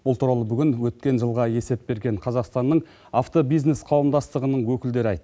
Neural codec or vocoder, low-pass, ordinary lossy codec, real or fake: none; none; none; real